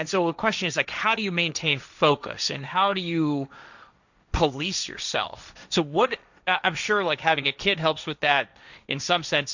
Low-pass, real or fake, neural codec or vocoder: 7.2 kHz; fake; codec, 16 kHz, 1.1 kbps, Voila-Tokenizer